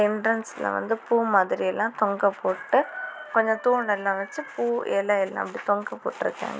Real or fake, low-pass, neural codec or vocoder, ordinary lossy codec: real; none; none; none